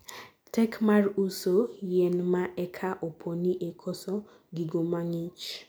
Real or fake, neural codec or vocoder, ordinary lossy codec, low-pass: real; none; none; none